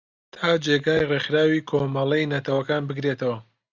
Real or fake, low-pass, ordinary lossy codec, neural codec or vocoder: real; 7.2 kHz; Opus, 64 kbps; none